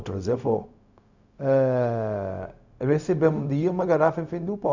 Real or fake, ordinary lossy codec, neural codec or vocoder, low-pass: fake; none; codec, 16 kHz, 0.4 kbps, LongCat-Audio-Codec; 7.2 kHz